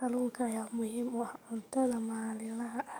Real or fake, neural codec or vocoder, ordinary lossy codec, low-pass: fake; codec, 44.1 kHz, 7.8 kbps, DAC; none; none